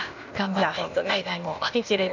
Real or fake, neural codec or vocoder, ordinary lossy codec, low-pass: fake; codec, 16 kHz, 0.8 kbps, ZipCodec; none; 7.2 kHz